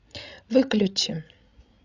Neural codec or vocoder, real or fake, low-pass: codec, 16 kHz, 16 kbps, FreqCodec, larger model; fake; 7.2 kHz